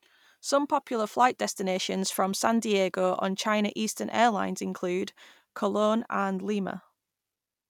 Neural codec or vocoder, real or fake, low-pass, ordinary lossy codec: none; real; 19.8 kHz; none